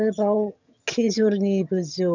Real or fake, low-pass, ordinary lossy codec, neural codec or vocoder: fake; 7.2 kHz; none; vocoder, 22.05 kHz, 80 mel bands, HiFi-GAN